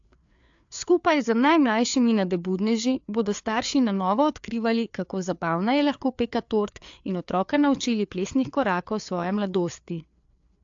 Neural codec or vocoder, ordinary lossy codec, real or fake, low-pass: codec, 16 kHz, 4 kbps, FreqCodec, larger model; AAC, 64 kbps; fake; 7.2 kHz